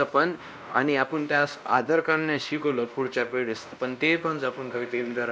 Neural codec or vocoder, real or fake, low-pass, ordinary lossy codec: codec, 16 kHz, 1 kbps, X-Codec, WavLM features, trained on Multilingual LibriSpeech; fake; none; none